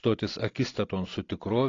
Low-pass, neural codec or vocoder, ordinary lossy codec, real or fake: 7.2 kHz; none; AAC, 32 kbps; real